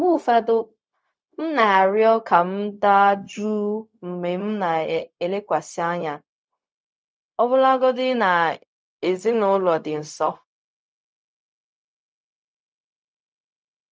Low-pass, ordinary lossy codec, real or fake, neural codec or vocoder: none; none; fake; codec, 16 kHz, 0.4 kbps, LongCat-Audio-Codec